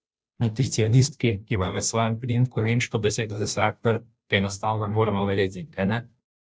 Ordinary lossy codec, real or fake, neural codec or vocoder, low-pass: none; fake; codec, 16 kHz, 0.5 kbps, FunCodec, trained on Chinese and English, 25 frames a second; none